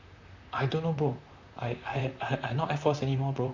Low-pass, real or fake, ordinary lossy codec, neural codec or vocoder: 7.2 kHz; fake; MP3, 64 kbps; vocoder, 44.1 kHz, 128 mel bands, Pupu-Vocoder